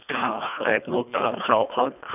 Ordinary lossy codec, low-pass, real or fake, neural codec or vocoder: none; 3.6 kHz; fake; codec, 24 kHz, 1.5 kbps, HILCodec